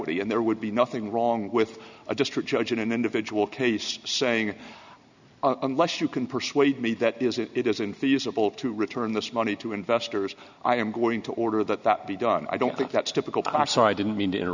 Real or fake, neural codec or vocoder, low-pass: real; none; 7.2 kHz